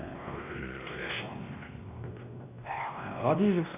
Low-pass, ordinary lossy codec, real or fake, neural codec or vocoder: 3.6 kHz; none; fake; codec, 16 kHz, 1 kbps, X-Codec, WavLM features, trained on Multilingual LibriSpeech